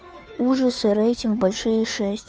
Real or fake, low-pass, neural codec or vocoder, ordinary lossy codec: fake; none; codec, 16 kHz, 2 kbps, FunCodec, trained on Chinese and English, 25 frames a second; none